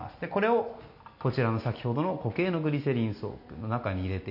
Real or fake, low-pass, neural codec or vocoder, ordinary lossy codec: real; 5.4 kHz; none; none